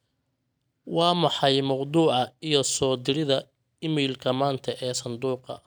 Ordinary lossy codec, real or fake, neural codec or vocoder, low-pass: none; real; none; none